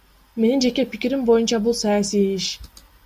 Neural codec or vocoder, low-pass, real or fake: none; 14.4 kHz; real